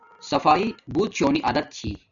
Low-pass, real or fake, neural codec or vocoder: 7.2 kHz; real; none